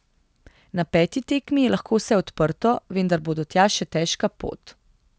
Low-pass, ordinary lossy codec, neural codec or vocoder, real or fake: none; none; none; real